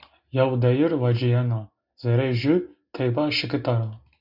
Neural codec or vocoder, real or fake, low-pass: none; real; 5.4 kHz